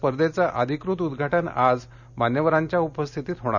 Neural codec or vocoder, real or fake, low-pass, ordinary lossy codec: none; real; 7.2 kHz; none